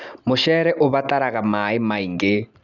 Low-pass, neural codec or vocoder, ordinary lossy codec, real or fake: 7.2 kHz; none; none; real